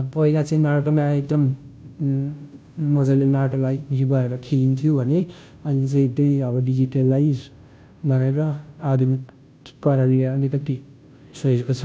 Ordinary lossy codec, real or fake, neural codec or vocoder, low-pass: none; fake; codec, 16 kHz, 0.5 kbps, FunCodec, trained on Chinese and English, 25 frames a second; none